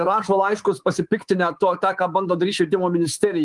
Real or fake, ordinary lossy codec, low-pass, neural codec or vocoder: fake; Opus, 24 kbps; 10.8 kHz; codec, 24 kHz, 3.1 kbps, DualCodec